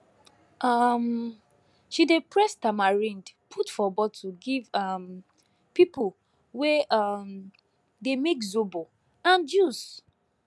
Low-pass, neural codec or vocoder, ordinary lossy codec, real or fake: none; none; none; real